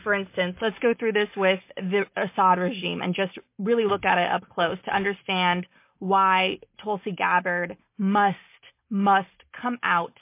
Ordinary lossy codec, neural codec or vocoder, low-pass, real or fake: MP3, 24 kbps; none; 3.6 kHz; real